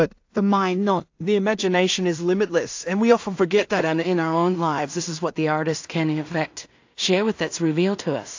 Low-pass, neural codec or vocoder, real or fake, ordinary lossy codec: 7.2 kHz; codec, 16 kHz in and 24 kHz out, 0.4 kbps, LongCat-Audio-Codec, two codebook decoder; fake; AAC, 48 kbps